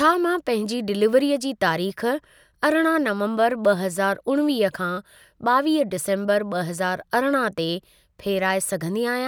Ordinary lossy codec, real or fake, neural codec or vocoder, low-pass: none; real; none; none